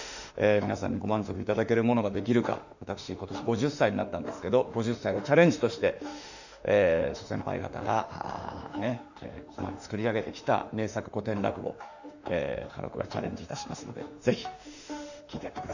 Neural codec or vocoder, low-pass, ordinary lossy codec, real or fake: autoencoder, 48 kHz, 32 numbers a frame, DAC-VAE, trained on Japanese speech; 7.2 kHz; none; fake